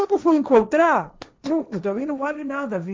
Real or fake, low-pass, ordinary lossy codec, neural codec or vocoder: fake; none; none; codec, 16 kHz, 1.1 kbps, Voila-Tokenizer